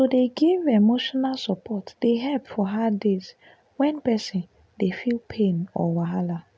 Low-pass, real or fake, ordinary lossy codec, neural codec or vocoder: none; real; none; none